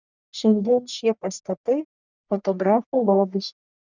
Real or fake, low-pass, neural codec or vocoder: fake; 7.2 kHz; codec, 44.1 kHz, 1.7 kbps, Pupu-Codec